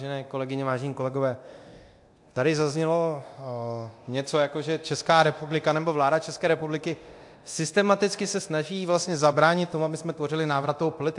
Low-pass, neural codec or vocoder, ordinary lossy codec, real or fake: 10.8 kHz; codec, 24 kHz, 0.9 kbps, DualCodec; AAC, 64 kbps; fake